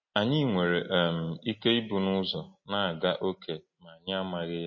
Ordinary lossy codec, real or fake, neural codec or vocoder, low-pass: MP3, 32 kbps; real; none; 7.2 kHz